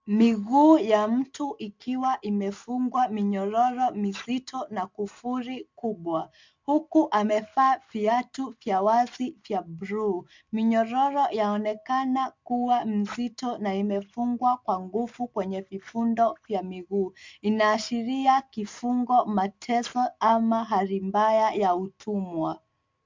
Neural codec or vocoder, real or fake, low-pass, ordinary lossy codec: none; real; 7.2 kHz; MP3, 64 kbps